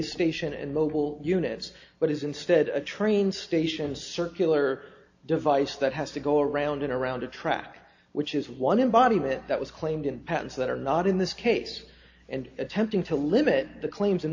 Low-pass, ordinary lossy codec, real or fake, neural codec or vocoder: 7.2 kHz; AAC, 48 kbps; real; none